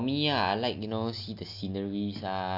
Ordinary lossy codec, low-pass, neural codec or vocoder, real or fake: none; 5.4 kHz; none; real